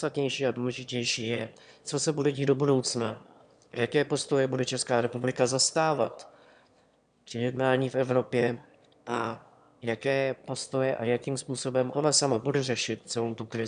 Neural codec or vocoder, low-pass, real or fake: autoencoder, 22.05 kHz, a latent of 192 numbers a frame, VITS, trained on one speaker; 9.9 kHz; fake